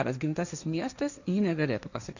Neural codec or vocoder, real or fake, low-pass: codec, 16 kHz, 1.1 kbps, Voila-Tokenizer; fake; 7.2 kHz